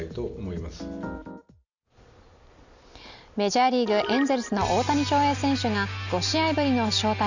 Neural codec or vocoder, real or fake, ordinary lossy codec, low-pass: none; real; none; 7.2 kHz